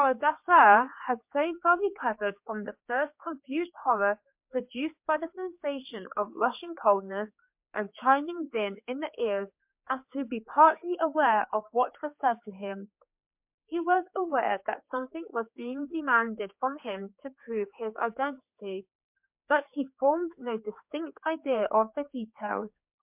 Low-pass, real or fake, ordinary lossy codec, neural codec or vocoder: 3.6 kHz; fake; MP3, 32 kbps; codec, 16 kHz, 4 kbps, X-Codec, HuBERT features, trained on general audio